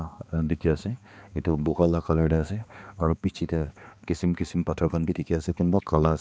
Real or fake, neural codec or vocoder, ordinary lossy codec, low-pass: fake; codec, 16 kHz, 2 kbps, X-Codec, HuBERT features, trained on balanced general audio; none; none